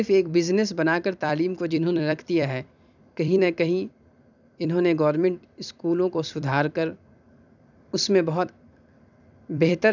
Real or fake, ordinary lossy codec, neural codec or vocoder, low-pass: fake; none; vocoder, 44.1 kHz, 128 mel bands every 256 samples, BigVGAN v2; 7.2 kHz